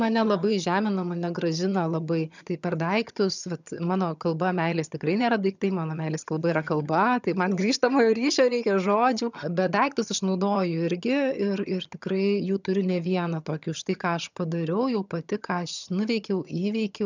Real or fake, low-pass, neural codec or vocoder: fake; 7.2 kHz; vocoder, 22.05 kHz, 80 mel bands, HiFi-GAN